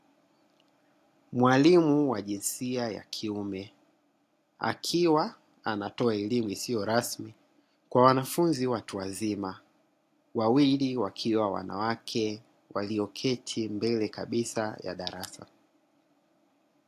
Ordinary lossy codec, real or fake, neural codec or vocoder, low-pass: AAC, 64 kbps; real; none; 14.4 kHz